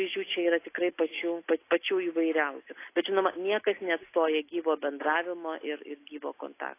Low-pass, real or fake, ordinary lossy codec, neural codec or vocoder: 3.6 kHz; real; AAC, 24 kbps; none